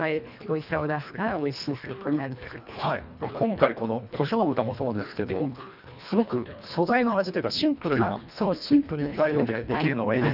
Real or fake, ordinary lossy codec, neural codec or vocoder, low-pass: fake; none; codec, 24 kHz, 1.5 kbps, HILCodec; 5.4 kHz